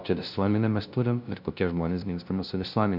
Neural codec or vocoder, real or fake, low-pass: codec, 16 kHz, 0.5 kbps, FunCodec, trained on LibriTTS, 25 frames a second; fake; 5.4 kHz